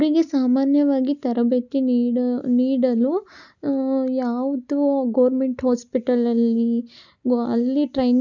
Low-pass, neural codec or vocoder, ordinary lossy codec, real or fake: 7.2 kHz; none; none; real